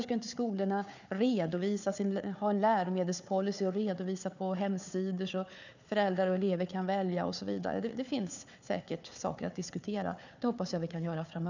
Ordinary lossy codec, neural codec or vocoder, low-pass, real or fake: none; codec, 16 kHz, 4 kbps, FunCodec, trained on Chinese and English, 50 frames a second; 7.2 kHz; fake